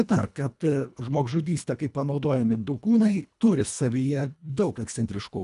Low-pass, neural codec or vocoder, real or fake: 10.8 kHz; codec, 24 kHz, 1.5 kbps, HILCodec; fake